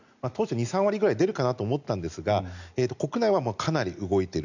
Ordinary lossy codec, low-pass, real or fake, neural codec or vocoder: none; 7.2 kHz; real; none